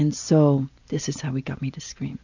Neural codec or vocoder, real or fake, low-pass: none; real; 7.2 kHz